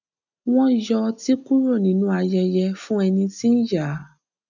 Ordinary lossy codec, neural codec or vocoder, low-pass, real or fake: none; none; 7.2 kHz; real